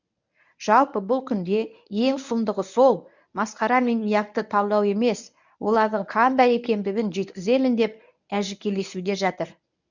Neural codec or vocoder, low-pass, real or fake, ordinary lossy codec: codec, 24 kHz, 0.9 kbps, WavTokenizer, medium speech release version 1; 7.2 kHz; fake; none